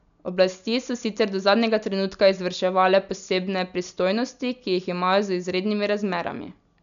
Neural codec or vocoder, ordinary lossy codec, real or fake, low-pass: none; none; real; 7.2 kHz